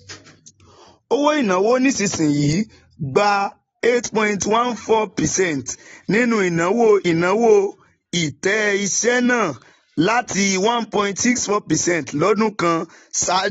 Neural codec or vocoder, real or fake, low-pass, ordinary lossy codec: none; real; 19.8 kHz; AAC, 24 kbps